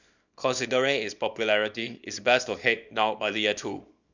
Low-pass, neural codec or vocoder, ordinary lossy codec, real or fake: 7.2 kHz; codec, 24 kHz, 0.9 kbps, WavTokenizer, small release; none; fake